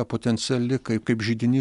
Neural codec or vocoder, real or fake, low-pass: none; real; 10.8 kHz